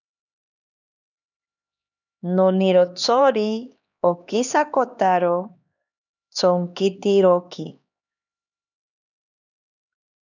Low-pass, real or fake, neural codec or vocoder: 7.2 kHz; fake; codec, 16 kHz, 2 kbps, X-Codec, HuBERT features, trained on LibriSpeech